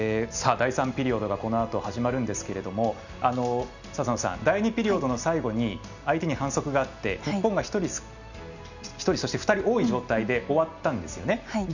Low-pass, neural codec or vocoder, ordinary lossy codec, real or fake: 7.2 kHz; none; none; real